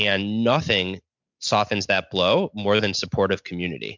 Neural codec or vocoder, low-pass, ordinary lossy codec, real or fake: none; 7.2 kHz; MP3, 64 kbps; real